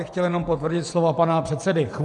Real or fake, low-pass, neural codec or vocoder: fake; 10.8 kHz; vocoder, 24 kHz, 100 mel bands, Vocos